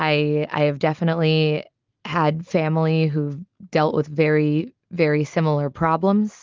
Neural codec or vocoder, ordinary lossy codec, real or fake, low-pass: none; Opus, 32 kbps; real; 7.2 kHz